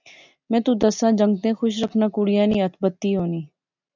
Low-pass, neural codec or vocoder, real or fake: 7.2 kHz; none; real